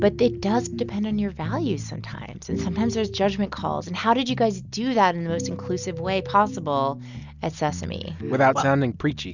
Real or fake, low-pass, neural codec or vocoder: real; 7.2 kHz; none